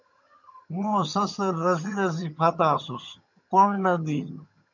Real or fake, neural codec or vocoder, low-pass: fake; vocoder, 22.05 kHz, 80 mel bands, HiFi-GAN; 7.2 kHz